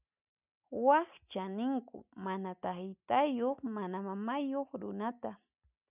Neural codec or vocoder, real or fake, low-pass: none; real; 3.6 kHz